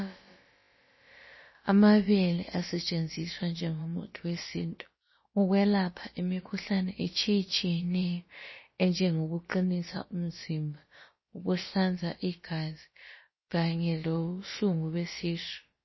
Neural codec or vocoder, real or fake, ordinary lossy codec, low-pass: codec, 16 kHz, about 1 kbps, DyCAST, with the encoder's durations; fake; MP3, 24 kbps; 7.2 kHz